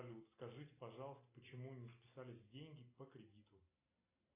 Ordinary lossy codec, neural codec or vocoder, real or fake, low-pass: AAC, 24 kbps; none; real; 3.6 kHz